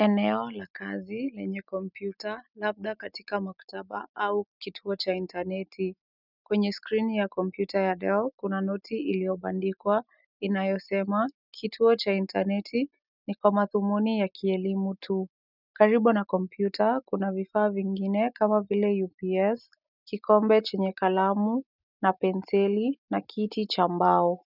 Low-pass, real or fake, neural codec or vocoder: 5.4 kHz; real; none